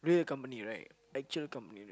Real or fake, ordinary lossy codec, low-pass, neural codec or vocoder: real; none; none; none